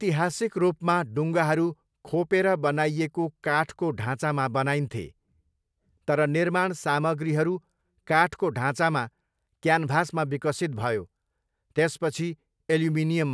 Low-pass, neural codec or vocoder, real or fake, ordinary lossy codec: none; none; real; none